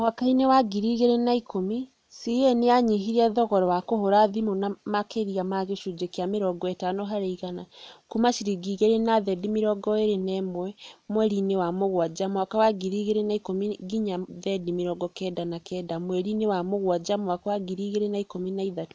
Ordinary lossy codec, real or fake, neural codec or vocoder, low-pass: none; real; none; none